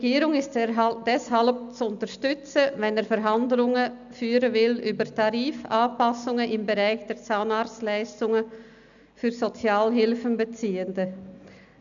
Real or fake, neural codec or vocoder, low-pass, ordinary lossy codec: real; none; 7.2 kHz; none